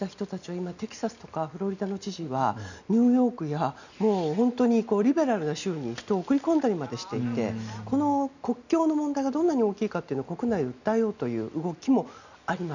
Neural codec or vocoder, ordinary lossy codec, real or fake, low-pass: none; none; real; 7.2 kHz